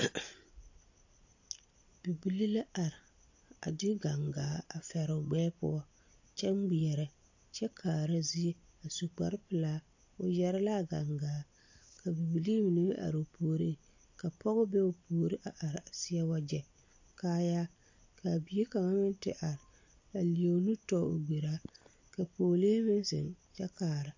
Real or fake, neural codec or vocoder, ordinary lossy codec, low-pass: real; none; AAC, 48 kbps; 7.2 kHz